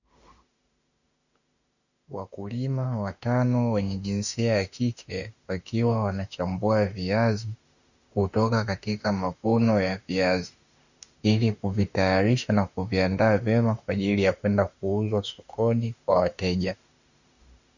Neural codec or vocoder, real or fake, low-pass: autoencoder, 48 kHz, 32 numbers a frame, DAC-VAE, trained on Japanese speech; fake; 7.2 kHz